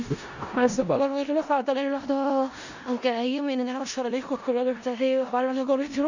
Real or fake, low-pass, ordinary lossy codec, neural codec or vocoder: fake; 7.2 kHz; Opus, 64 kbps; codec, 16 kHz in and 24 kHz out, 0.4 kbps, LongCat-Audio-Codec, four codebook decoder